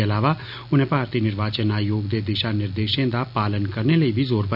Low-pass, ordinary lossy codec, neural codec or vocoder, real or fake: 5.4 kHz; none; none; real